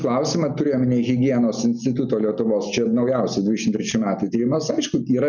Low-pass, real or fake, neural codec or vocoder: 7.2 kHz; real; none